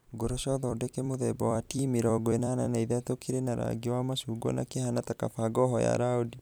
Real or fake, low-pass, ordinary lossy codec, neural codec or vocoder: fake; none; none; vocoder, 44.1 kHz, 128 mel bands every 256 samples, BigVGAN v2